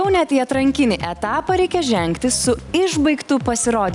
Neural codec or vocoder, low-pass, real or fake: none; 10.8 kHz; real